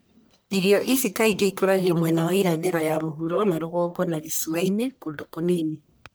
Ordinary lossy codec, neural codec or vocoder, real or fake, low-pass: none; codec, 44.1 kHz, 1.7 kbps, Pupu-Codec; fake; none